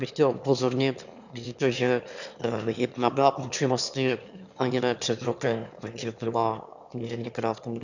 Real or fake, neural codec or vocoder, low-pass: fake; autoencoder, 22.05 kHz, a latent of 192 numbers a frame, VITS, trained on one speaker; 7.2 kHz